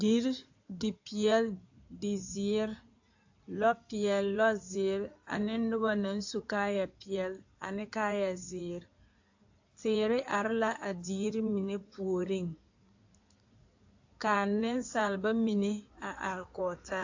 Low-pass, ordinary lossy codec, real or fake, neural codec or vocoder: 7.2 kHz; AAC, 48 kbps; fake; codec, 16 kHz in and 24 kHz out, 2.2 kbps, FireRedTTS-2 codec